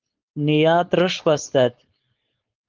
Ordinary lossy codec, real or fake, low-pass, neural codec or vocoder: Opus, 32 kbps; fake; 7.2 kHz; codec, 16 kHz, 4.8 kbps, FACodec